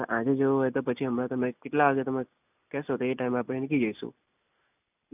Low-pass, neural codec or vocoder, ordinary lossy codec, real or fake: 3.6 kHz; none; none; real